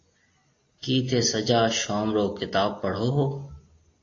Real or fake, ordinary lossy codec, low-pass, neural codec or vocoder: real; AAC, 32 kbps; 7.2 kHz; none